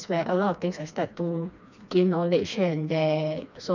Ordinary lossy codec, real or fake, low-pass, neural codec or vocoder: none; fake; 7.2 kHz; codec, 16 kHz, 2 kbps, FreqCodec, smaller model